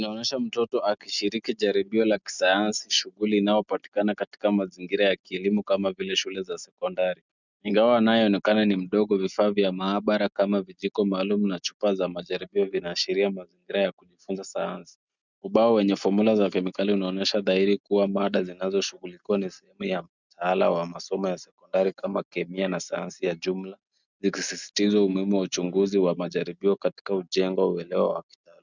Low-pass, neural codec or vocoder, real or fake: 7.2 kHz; none; real